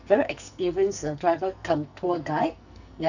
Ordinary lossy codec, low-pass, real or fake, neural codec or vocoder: none; 7.2 kHz; fake; codec, 44.1 kHz, 2.6 kbps, SNAC